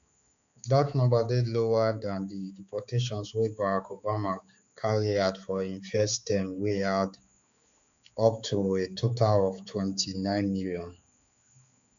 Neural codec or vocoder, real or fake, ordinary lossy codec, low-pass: codec, 16 kHz, 4 kbps, X-Codec, HuBERT features, trained on balanced general audio; fake; MP3, 96 kbps; 7.2 kHz